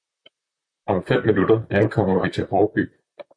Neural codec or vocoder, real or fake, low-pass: vocoder, 44.1 kHz, 128 mel bands, Pupu-Vocoder; fake; 9.9 kHz